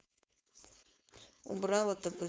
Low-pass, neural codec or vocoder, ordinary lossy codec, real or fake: none; codec, 16 kHz, 4.8 kbps, FACodec; none; fake